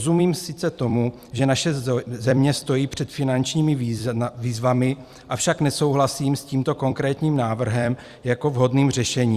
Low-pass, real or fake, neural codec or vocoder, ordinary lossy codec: 14.4 kHz; fake; vocoder, 44.1 kHz, 128 mel bands every 256 samples, BigVGAN v2; Opus, 64 kbps